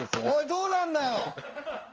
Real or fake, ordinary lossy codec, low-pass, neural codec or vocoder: fake; Opus, 24 kbps; 7.2 kHz; codec, 16 kHz in and 24 kHz out, 1 kbps, XY-Tokenizer